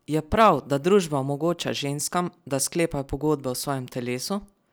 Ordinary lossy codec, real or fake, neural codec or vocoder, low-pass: none; real; none; none